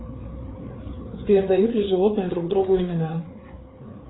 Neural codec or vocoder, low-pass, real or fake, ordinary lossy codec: codec, 16 kHz, 4 kbps, FreqCodec, larger model; 7.2 kHz; fake; AAC, 16 kbps